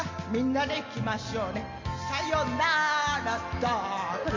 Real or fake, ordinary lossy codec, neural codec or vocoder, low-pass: real; MP3, 48 kbps; none; 7.2 kHz